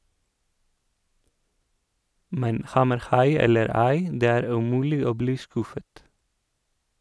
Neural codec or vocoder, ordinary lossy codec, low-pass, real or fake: none; none; none; real